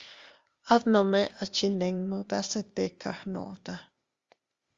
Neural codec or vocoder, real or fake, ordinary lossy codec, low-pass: codec, 16 kHz, 0.8 kbps, ZipCodec; fake; Opus, 32 kbps; 7.2 kHz